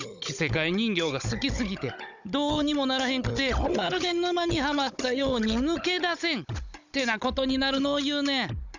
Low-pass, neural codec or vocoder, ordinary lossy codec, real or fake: 7.2 kHz; codec, 16 kHz, 16 kbps, FunCodec, trained on Chinese and English, 50 frames a second; none; fake